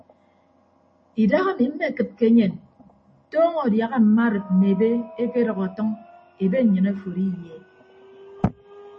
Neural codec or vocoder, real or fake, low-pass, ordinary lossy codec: none; real; 10.8 kHz; MP3, 32 kbps